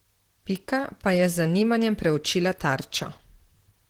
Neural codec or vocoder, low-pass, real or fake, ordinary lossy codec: vocoder, 44.1 kHz, 128 mel bands, Pupu-Vocoder; 19.8 kHz; fake; Opus, 16 kbps